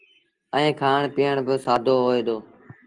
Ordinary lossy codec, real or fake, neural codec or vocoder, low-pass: Opus, 24 kbps; real; none; 9.9 kHz